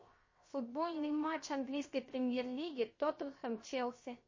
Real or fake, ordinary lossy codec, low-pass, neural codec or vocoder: fake; MP3, 32 kbps; 7.2 kHz; codec, 16 kHz, 0.7 kbps, FocalCodec